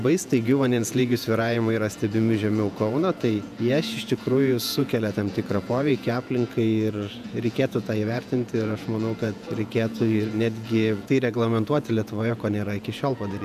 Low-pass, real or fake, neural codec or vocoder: 14.4 kHz; fake; vocoder, 48 kHz, 128 mel bands, Vocos